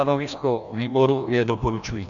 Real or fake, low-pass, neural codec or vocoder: fake; 7.2 kHz; codec, 16 kHz, 1 kbps, FreqCodec, larger model